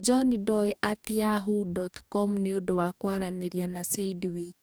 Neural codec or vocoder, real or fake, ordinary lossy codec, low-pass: codec, 44.1 kHz, 2.6 kbps, DAC; fake; none; none